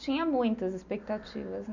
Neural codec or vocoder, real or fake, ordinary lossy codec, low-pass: none; real; none; 7.2 kHz